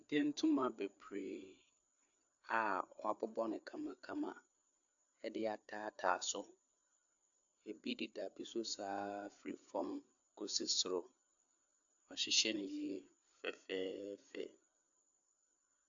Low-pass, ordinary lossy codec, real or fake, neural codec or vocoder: 7.2 kHz; AAC, 64 kbps; fake; codec, 16 kHz, 4 kbps, FreqCodec, larger model